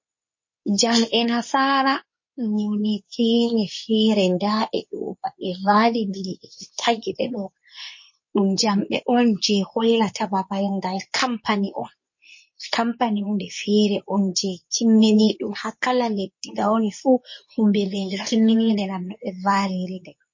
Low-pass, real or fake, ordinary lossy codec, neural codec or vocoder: 7.2 kHz; fake; MP3, 32 kbps; codec, 24 kHz, 0.9 kbps, WavTokenizer, medium speech release version 2